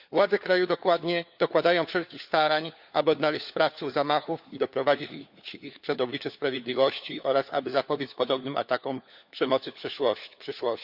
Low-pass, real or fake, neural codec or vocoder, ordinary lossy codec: 5.4 kHz; fake; codec, 16 kHz, 4 kbps, FunCodec, trained on LibriTTS, 50 frames a second; Opus, 64 kbps